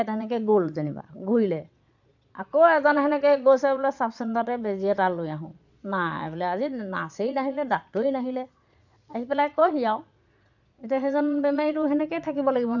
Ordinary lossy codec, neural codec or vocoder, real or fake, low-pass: none; vocoder, 22.05 kHz, 80 mel bands, Vocos; fake; 7.2 kHz